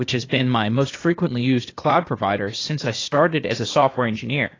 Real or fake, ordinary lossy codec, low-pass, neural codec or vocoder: fake; AAC, 32 kbps; 7.2 kHz; codec, 16 kHz, 0.8 kbps, ZipCodec